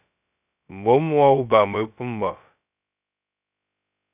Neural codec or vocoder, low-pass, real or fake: codec, 16 kHz, 0.2 kbps, FocalCodec; 3.6 kHz; fake